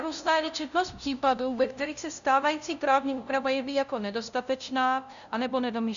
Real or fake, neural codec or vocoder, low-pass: fake; codec, 16 kHz, 0.5 kbps, FunCodec, trained on LibriTTS, 25 frames a second; 7.2 kHz